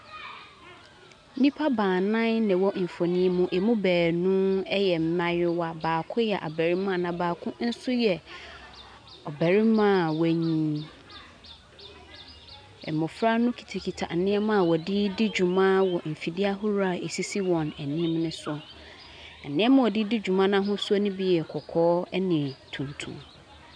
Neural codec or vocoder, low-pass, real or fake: none; 9.9 kHz; real